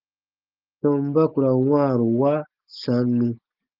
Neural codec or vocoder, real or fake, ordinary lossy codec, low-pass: none; real; Opus, 24 kbps; 5.4 kHz